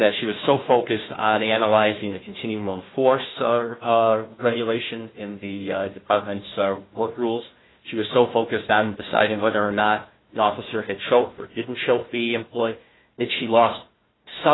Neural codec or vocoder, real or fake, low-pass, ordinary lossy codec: codec, 16 kHz, 1 kbps, FunCodec, trained on Chinese and English, 50 frames a second; fake; 7.2 kHz; AAC, 16 kbps